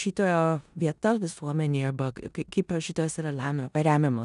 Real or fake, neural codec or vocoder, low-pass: fake; codec, 16 kHz in and 24 kHz out, 0.9 kbps, LongCat-Audio-Codec, four codebook decoder; 10.8 kHz